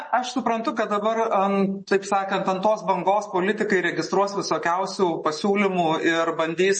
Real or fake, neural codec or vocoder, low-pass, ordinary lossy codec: real; none; 10.8 kHz; MP3, 32 kbps